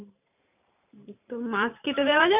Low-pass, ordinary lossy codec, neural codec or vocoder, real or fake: 3.6 kHz; none; vocoder, 44.1 kHz, 128 mel bands every 512 samples, BigVGAN v2; fake